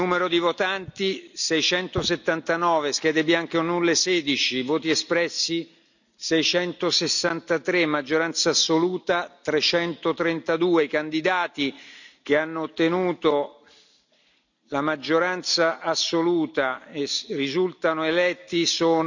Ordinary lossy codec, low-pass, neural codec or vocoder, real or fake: none; 7.2 kHz; none; real